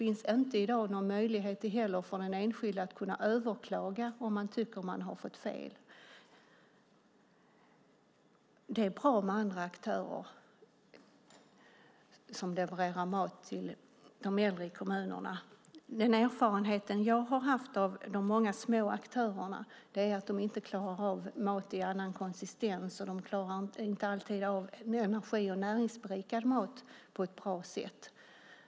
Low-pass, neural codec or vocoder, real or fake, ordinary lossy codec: none; none; real; none